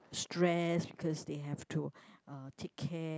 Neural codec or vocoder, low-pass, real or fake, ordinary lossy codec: none; none; real; none